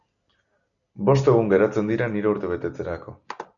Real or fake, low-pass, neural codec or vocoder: real; 7.2 kHz; none